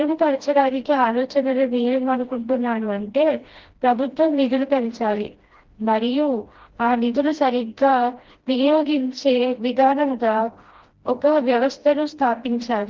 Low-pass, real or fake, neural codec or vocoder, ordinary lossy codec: 7.2 kHz; fake; codec, 16 kHz, 1 kbps, FreqCodec, smaller model; Opus, 16 kbps